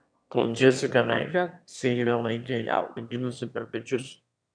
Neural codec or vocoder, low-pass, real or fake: autoencoder, 22.05 kHz, a latent of 192 numbers a frame, VITS, trained on one speaker; 9.9 kHz; fake